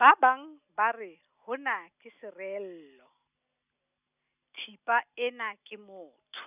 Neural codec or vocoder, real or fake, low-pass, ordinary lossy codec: none; real; 3.6 kHz; none